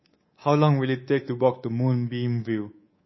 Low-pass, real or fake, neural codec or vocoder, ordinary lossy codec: 7.2 kHz; fake; codec, 24 kHz, 3.1 kbps, DualCodec; MP3, 24 kbps